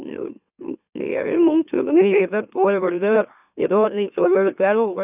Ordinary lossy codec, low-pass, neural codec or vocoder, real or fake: none; 3.6 kHz; autoencoder, 44.1 kHz, a latent of 192 numbers a frame, MeloTTS; fake